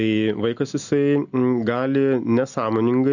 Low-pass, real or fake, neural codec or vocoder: 7.2 kHz; real; none